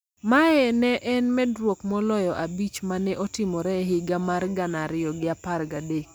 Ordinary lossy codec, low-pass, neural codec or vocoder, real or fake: none; none; none; real